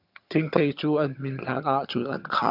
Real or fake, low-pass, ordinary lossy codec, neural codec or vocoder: fake; 5.4 kHz; AAC, 48 kbps; vocoder, 22.05 kHz, 80 mel bands, HiFi-GAN